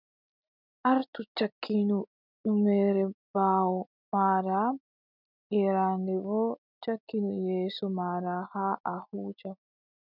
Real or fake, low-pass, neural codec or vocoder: real; 5.4 kHz; none